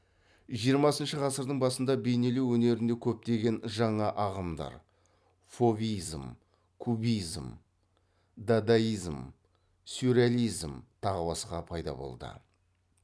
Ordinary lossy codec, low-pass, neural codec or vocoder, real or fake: none; none; none; real